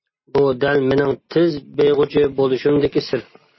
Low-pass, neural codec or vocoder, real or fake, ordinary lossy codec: 7.2 kHz; none; real; MP3, 24 kbps